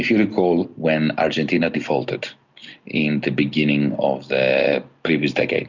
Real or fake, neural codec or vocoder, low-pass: real; none; 7.2 kHz